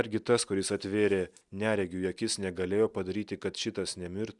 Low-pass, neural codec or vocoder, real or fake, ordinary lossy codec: 10.8 kHz; none; real; Opus, 64 kbps